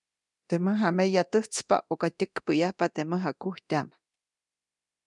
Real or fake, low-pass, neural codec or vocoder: fake; 10.8 kHz; codec, 24 kHz, 0.9 kbps, DualCodec